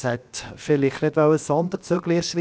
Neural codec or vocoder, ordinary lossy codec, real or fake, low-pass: codec, 16 kHz, 0.7 kbps, FocalCodec; none; fake; none